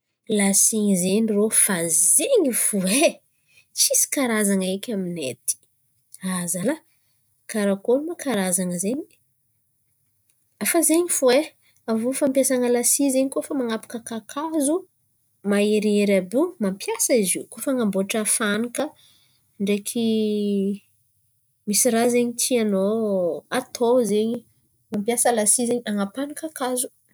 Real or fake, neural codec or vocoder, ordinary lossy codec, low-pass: real; none; none; none